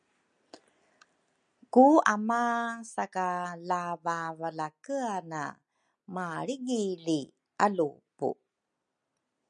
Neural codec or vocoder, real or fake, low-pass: none; real; 9.9 kHz